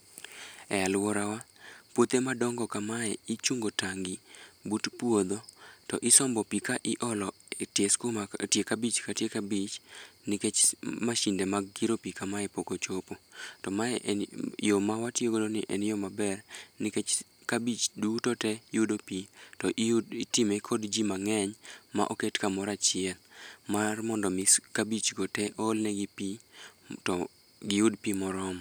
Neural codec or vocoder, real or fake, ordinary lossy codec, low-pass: none; real; none; none